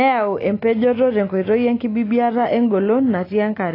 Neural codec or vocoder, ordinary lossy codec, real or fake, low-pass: none; AAC, 24 kbps; real; 5.4 kHz